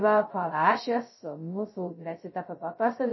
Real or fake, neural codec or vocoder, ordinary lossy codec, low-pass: fake; codec, 16 kHz, 0.2 kbps, FocalCodec; MP3, 24 kbps; 7.2 kHz